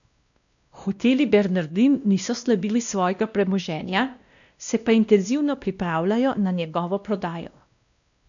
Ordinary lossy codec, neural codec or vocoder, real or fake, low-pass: none; codec, 16 kHz, 1 kbps, X-Codec, WavLM features, trained on Multilingual LibriSpeech; fake; 7.2 kHz